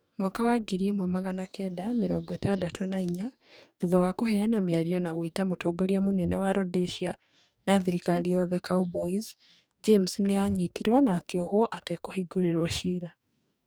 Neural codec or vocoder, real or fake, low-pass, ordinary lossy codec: codec, 44.1 kHz, 2.6 kbps, DAC; fake; none; none